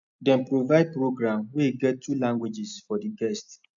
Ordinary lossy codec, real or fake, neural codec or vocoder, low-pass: none; real; none; 7.2 kHz